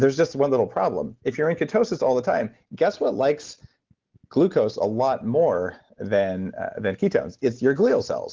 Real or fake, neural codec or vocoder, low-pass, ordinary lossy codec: real; none; 7.2 kHz; Opus, 16 kbps